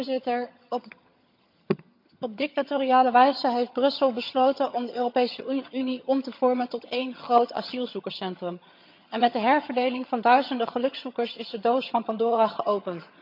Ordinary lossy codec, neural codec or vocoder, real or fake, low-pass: MP3, 48 kbps; vocoder, 22.05 kHz, 80 mel bands, HiFi-GAN; fake; 5.4 kHz